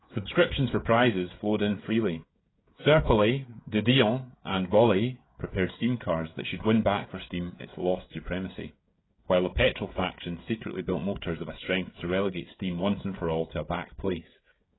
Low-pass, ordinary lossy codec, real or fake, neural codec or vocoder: 7.2 kHz; AAC, 16 kbps; fake; codec, 16 kHz, 16 kbps, FreqCodec, smaller model